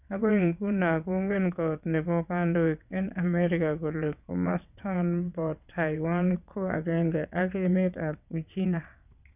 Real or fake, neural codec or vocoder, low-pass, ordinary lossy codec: fake; vocoder, 22.05 kHz, 80 mel bands, WaveNeXt; 3.6 kHz; none